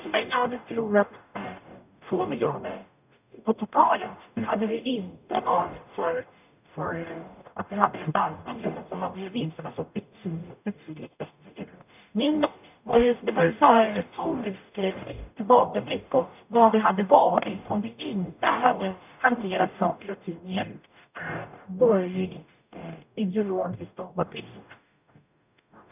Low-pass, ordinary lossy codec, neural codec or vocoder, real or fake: 3.6 kHz; none; codec, 44.1 kHz, 0.9 kbps, DAC; fake